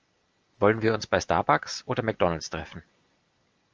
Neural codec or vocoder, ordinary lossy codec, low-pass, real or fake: none; Opus, 24 kbps; 7.2 kHz; real